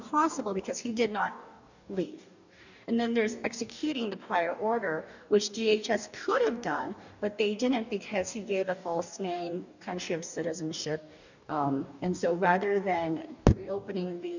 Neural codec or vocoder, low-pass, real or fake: codec, 44.1 kHz, 2.6 kbps, DAC; 7.2 kHz; fake